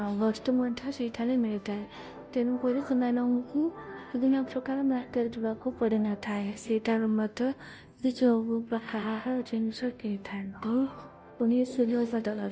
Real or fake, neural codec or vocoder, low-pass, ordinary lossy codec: fake; codec, 16 kHz, 0.5 kbps, FunCodec, trained on Chinese and English, 25 frames a second; none; none